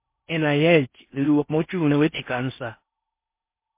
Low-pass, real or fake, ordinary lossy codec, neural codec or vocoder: 3.6 kHz; fake; MP3, 24 kbps; codec, 16 kHz in and 24 kHz out, 0.6 kbps, FocalCodec, streaming, 4096 codes